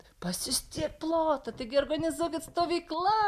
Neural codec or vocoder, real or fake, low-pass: none; real; 14.4 kHz